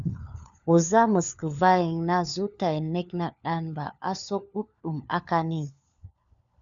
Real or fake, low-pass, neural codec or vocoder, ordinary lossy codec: fake; 7.2 kHz; codec, 16 kHz, 4 kbps, FunCodec, trained on LibriTTS, 50 frames a second; MP3, 96 kbps